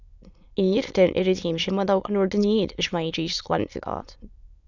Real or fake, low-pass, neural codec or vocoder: fake; 7.2 kHz; autoencoder, 22.05 kHz, a latent of 192 numbers a frame, VITS, trained on many speakers